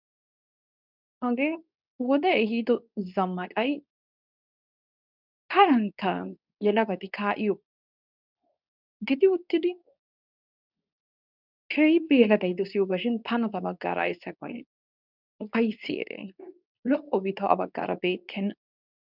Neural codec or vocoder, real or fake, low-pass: codec, 24 kHz, 0.9 kbps, WavTokenizer, medium speech release version 2; fake; 5.4 kHz